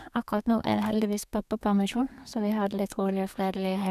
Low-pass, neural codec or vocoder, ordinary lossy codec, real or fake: 14.4 kHz; codec, 32 kHz, 1.9 kbps, SNAC; none; fake